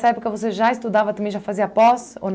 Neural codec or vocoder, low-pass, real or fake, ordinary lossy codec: none; none; real; none